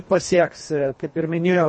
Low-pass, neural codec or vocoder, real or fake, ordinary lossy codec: 9.9 kHz; codec, 24 kHz, 1.5 kbps, HILCodec; fake; MP3, 32 kbps